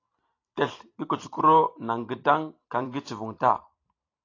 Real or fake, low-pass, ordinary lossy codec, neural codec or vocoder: fake; 7.2 kHz; AAC, 32 kbps; vocoder, 44.1 kHz, 128 mel bands every 256 samples, BigVGAN v2